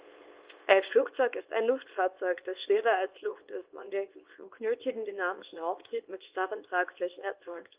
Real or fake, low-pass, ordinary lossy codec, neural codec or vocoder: fake; 3.6 kHz; Opus, 24 kbps; codec, 24 kHz, 0.9 kbps, WavTokenizer, small release